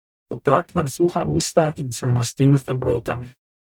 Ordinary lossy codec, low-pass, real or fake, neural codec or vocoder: none; 19.8 kHz; fake; codec, 44.1 kHz, 0.9 kbps, DAC